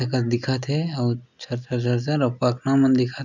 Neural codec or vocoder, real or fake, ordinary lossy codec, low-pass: none; real; none; 7.2 kHz